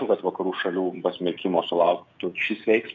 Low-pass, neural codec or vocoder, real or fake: 7.2 kHz; codec, 16 kHz, 16 kbps, FreqCodec, smaller model; fake